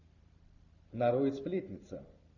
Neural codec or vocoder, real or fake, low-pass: none; real; 7.2 kHz